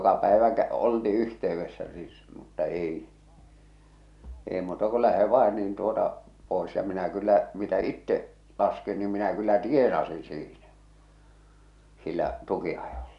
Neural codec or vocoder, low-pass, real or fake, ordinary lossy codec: none; 10.8 kHz; real; none